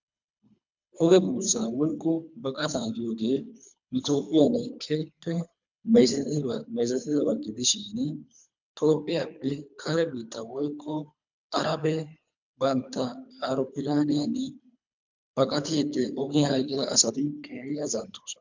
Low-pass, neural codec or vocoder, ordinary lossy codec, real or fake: 7.2 kHz; codec, 24 kHz, 3 kbps, HILCodec; MP3, 64 kbps; fake